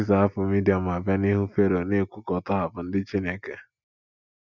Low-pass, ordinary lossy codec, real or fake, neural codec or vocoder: 7.2 kHz; none; real; none